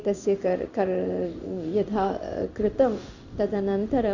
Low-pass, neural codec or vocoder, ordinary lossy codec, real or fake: 7.2 kHz; codec, 16 kHz in and 24 kHz out, 1 kbps, XY-Tokenizer; none; fake